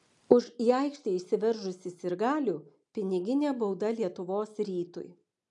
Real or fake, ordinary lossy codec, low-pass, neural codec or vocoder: real; AAC, 64 kbps; 10.8 kHz; none